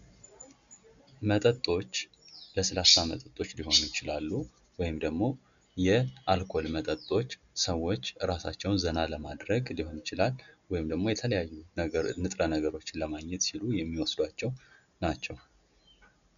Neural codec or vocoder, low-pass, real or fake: none; 7.2 kHz; real